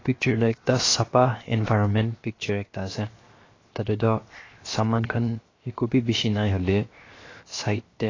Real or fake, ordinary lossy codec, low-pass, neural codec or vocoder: fake; AAC, 32 kbps; 7.2 kHz; codec, 16 kHz, 0.7 kbps, FocalCodec